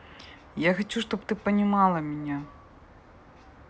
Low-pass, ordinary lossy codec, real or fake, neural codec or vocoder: none; none; real; none